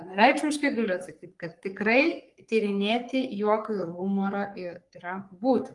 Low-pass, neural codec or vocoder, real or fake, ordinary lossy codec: 10.8 kHz; codec, 44.1 kHz, 3.4 kbps, Pupu-Codec; fake; Opus, 32 kbps